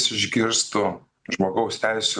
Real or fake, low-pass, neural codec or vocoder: real; 9.9 kHz; none